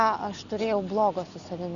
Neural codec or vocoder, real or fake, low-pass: none; real; 7.2 kHz